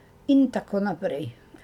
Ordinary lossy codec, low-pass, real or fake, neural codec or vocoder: none; 19.8 kHz; real; none